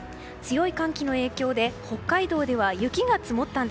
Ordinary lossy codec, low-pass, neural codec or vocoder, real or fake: none; none; none; real